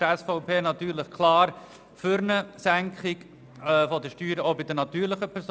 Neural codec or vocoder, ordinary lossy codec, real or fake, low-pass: none; none; real; none